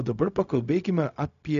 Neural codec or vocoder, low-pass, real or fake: codec, 16 kHz, 0.4 kbps, LongCat-Audio-Codec; 7.2 kHz; fake